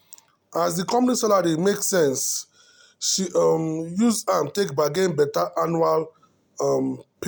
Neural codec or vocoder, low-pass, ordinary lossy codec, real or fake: none; none; none; real